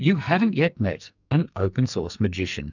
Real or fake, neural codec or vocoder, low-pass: fake; codec, 44.1 kHz, 2.6 kbps, SNAC; 7.2 kHz